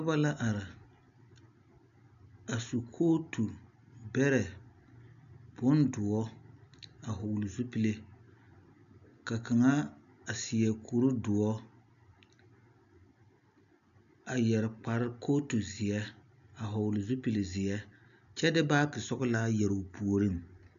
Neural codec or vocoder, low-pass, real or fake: none; 7.2 kHz; real